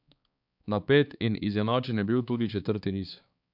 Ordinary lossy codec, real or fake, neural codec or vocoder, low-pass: none; fake; codec, 16 kHz, 4 kbps, X-Codec, HuBERT features, trained on balanced general audio; 5.4 kHz